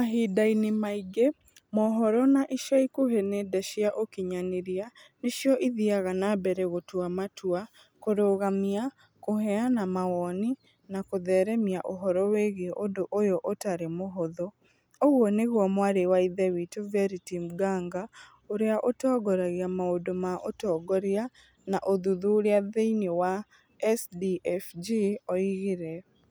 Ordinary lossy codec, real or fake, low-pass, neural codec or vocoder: none; real; none; none